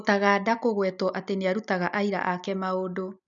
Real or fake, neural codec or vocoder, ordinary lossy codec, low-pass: real; none; none; 7.2 kHz